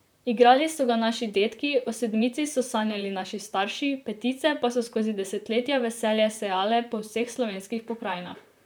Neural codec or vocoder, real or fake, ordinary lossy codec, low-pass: vocoder, 44.1 kHz, 128 mel bands, Pupu-Vocoder; fake; none; none